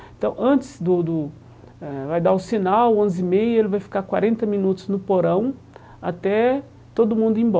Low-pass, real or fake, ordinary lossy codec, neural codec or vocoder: none; real; none; none